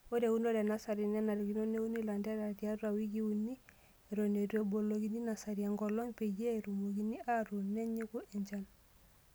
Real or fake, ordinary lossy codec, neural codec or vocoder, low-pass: real; none; none; none